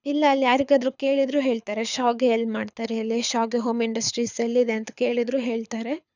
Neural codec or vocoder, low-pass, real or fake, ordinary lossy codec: codec, 24 kHz, 6 kbps, HILCodec; 7.2 kHz; fake; none